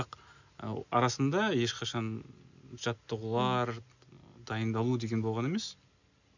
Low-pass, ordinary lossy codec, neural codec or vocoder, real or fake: 7.2 kHz; none; none; real